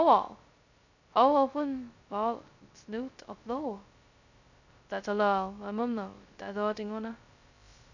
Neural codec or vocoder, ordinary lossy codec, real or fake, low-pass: codec, 16 kHz, 0.2 kbps, FocalCodec; none; fake; 7.2 kHz